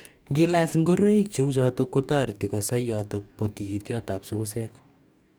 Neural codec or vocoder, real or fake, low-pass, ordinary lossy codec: codec, 44.1 kHz, 2.6 kbps, DAC; fake; none; none